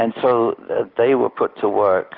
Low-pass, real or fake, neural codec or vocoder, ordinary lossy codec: 5.4 kHz; real; none; Opus, 32 kbps